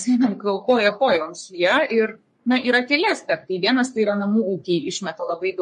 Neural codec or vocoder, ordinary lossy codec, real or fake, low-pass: codec, 44.1 kHz, 3.4 kbps, Pupu-Codec; MP3, 48 kbps; fake; 14.4 kHz